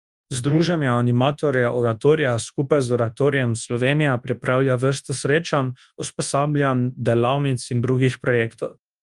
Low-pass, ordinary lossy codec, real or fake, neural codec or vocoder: 10.8 kHz; Opus, 32 kbps; fake; codec, 24 kHz, 0.9 kbps, WavTokenizer, large speech release